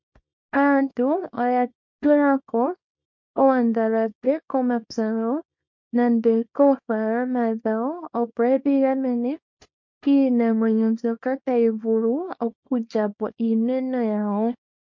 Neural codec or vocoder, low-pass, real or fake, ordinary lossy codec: codec, 24 kHz, 0.9 kbps, WavTokenizer, small release; 7.2 kHz; fake; MP3, 48 kbps